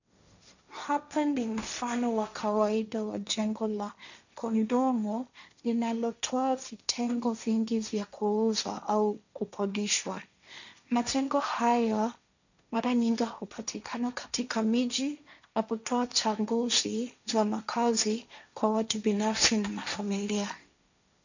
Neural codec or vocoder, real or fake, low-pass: codec, 16 kHz, 1.1 kbps, Voila-Tokenizer; fake; 7.2 kHz